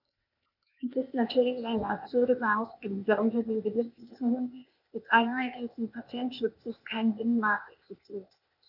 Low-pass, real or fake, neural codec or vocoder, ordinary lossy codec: 5.4 kHz; fake; codec, 16 kHz, 0.8 kbps, ZipCodec; none